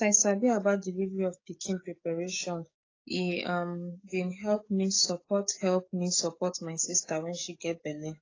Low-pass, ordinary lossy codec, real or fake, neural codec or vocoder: 7.2 kHz; AAC, 32 kbps; fake; codec, 44.1 kHz, 7.8 kbps, DAC